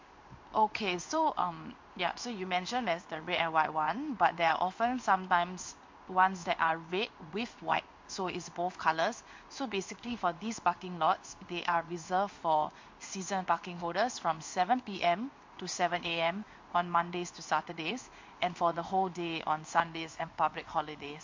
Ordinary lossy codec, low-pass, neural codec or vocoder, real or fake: MP3, 48 kbps; 7.2 kHz; codec, 16 kHz in and 24 kHz out, 1 kbps, XY-Tokenizer; fake